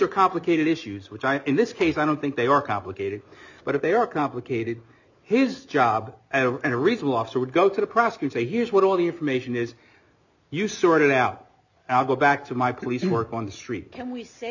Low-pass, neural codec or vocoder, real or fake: 7.2 kHz; none; real